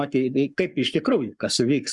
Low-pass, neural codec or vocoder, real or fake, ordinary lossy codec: 10.8 kHz; codec, 44.1 kHz, 7.8 kbps, Pupu-Codec; fake; Opus, 64 kbps